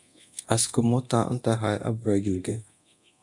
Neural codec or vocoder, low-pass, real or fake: codec, 24 kHz, 0.9 kbps, DualCodec; 10.8 kHz; fake